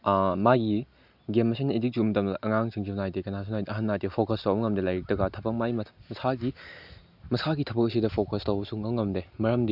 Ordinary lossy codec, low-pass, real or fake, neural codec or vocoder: none; 5.4 kHz; real; none